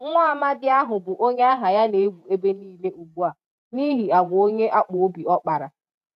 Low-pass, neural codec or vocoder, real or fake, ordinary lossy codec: 14.4 kHz; vocoder, 48 kHz, 128 mel bands, Vocos; fake; none